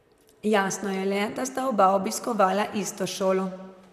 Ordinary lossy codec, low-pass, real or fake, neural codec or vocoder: none; 14.4 kHz; fake; vocoder, 44.1 kHz, 128 mel bands, Pupu-Vocoder